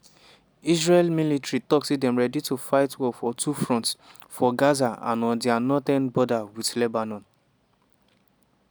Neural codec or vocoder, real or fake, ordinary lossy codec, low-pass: none; real; none; none